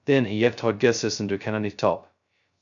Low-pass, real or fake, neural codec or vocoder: 7.2 kHz; fake; codec, 16 kHz, 0.2 kbps, FocalCodec